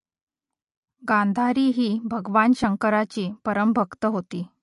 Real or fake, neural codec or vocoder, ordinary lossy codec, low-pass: real; none; MP3, 48 kbps; 14.4 kHz